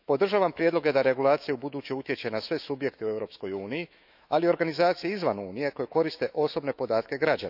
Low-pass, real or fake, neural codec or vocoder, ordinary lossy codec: 5.4 kHz; fake; autoencoder, 48 kHz, 128 numbers a frame, DAC-VAE, trained on Japanese speech; none